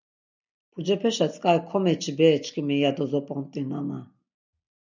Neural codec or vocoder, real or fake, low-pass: none; real; 7.2 kHz